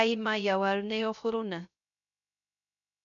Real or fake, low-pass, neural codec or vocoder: fake; 7.2 kHz; codec, 16 kHz, 0.3 kbps, FocalCodec